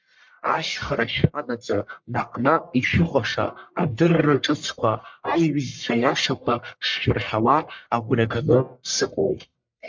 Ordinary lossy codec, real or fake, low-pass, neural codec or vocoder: MP3, 64 kbps; fake; 7.2 kHz; codec, 44.1 kHz, 1.7 kbps, Pupu-Codec